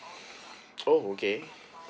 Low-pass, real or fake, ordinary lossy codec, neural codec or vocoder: none; real; none; none